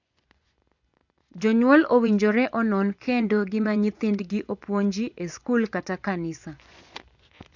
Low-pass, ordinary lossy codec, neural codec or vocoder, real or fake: 7.2 kHz; none; vocoder, 24 kHz, 100 mel bands, Vocos; fake